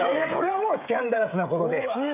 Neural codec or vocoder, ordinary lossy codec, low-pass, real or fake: codec, 16 kHz, 16 kbps, FreqCodec, smaller model; none; 3.6 kHz; fake